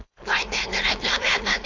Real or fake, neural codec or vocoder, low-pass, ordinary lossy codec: fake; codec, 16 kHz, 4.8 kbps, FACodec; 7.2 kHz; AAC, 48 kbps